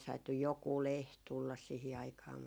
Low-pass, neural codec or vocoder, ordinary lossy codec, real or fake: none; none; none; real